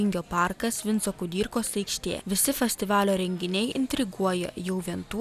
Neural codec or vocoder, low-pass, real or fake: none; 14.4 kHz; real